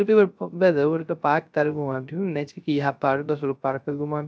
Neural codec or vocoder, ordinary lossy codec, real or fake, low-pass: codec, 16 kHz, 0.3 kbps, FocalCodec; none; fake; none